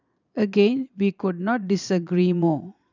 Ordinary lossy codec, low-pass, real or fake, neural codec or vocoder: none; 7.2 kHz; fake; vocoder, 22.05 kHz, 80 mel bands, Vocos